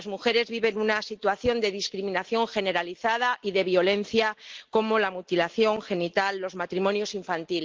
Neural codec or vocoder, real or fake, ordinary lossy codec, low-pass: none; real; Opus, 16 kbps; 7.2 kHz